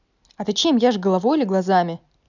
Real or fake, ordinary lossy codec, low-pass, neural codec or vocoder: real; none; 7.2 kHz; none